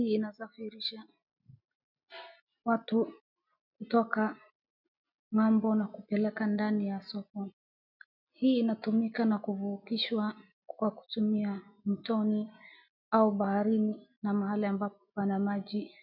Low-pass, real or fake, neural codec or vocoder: 5.4 kHz; real; none